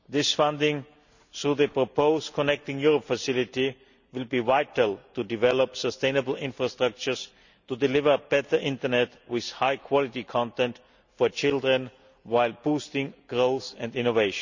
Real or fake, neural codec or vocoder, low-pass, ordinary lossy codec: real; none; 7.2 kHz; none